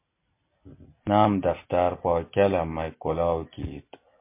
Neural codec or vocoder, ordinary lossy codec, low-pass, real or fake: none; MP3, 24 kbps; 3.6 kHz; real